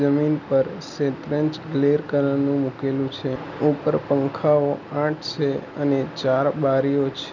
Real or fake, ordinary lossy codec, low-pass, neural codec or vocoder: real; none; 7.2 kHz; none